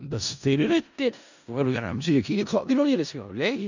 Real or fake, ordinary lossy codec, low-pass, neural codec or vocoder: fake; none; 7.2 kHz; codec, 16 kHz in and 24 kHz out, 0.4 kbps, LongCat-Audio-Codec, four codebook decoder